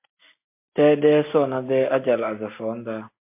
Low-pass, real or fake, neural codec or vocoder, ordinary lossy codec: 3.6 kHz; real; none; MP3, 24 kbps